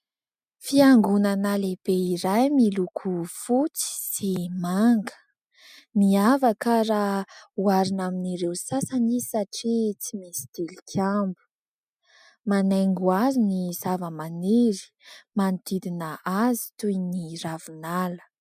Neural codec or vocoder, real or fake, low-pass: none; real; 14.4 kHz